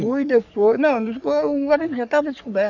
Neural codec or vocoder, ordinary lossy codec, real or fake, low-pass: codec, 44.1 kHz, 3.4 kbps, Pupu-Codec; none; fake; 7.2 kHz